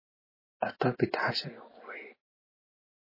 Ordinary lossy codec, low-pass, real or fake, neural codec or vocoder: MP3, 24 kbps; 5.4 kHz; real; none